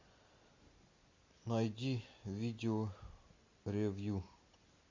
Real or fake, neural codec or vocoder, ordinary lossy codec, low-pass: real; none; MP3, 48 kbps; 7.2 kHz